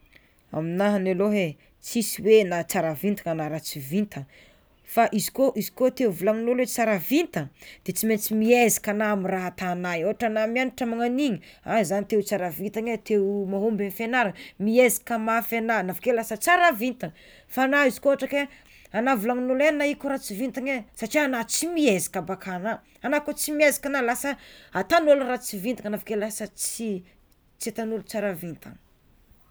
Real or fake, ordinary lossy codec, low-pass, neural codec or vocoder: real; none; none; none